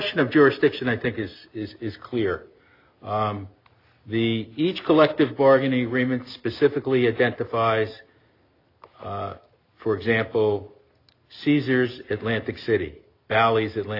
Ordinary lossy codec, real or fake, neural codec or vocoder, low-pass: AAC, 32 kbps; real; none; 5.4 kHz